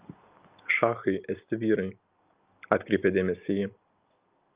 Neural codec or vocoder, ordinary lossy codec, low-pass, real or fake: none; Opus, 24 kbps; 3.6 kHz; real